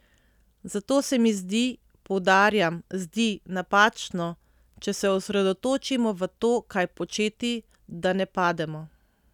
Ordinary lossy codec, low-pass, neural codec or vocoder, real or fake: none; 19.8 kHz; none; real